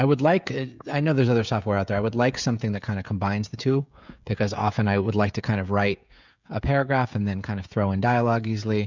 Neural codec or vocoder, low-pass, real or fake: codec, 16 kHz, 16 kbps, FreqCodec, smaller model; 7.2 kHz; fake